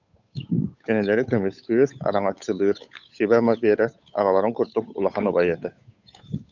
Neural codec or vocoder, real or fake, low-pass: codec, 16 kHz, 8 kbps, FunCodec, trained on Chinese and English, 25 frames a second; fake; 7.2 kHz